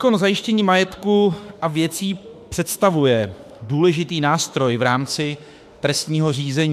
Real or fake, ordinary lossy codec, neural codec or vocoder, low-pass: fake; AAC, 96 kbps; autoencoder, 48 kHz, 32 numbers a frame, DAC-VAE, trained on Japanese speech; 14.4 kHz